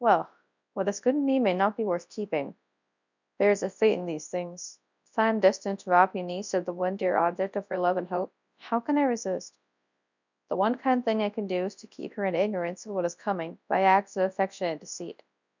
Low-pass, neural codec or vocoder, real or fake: 7.2 kHz; codec, 24 kHz, 0.9 kbps, WavTokenizer, large speech release; fake